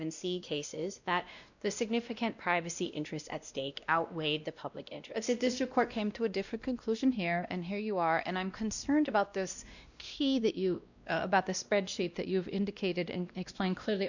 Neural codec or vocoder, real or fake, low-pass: codec, 16 kHz, 1 kbps, X-Codec, WavLM features, trained on Multilingual LibriSpeech; fake; 7.2 kHz